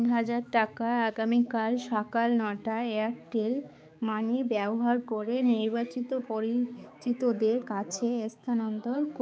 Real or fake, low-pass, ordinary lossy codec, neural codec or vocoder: fake; none; none; codec, 16 kHz, 4 kbps, X-Codec, HuBERT features, trained on balanced general audio